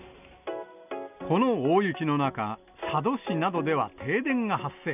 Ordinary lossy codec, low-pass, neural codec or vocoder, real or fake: none; 3.6 kHz; none; real